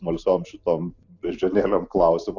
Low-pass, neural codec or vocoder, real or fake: 7.2 kHz; none; real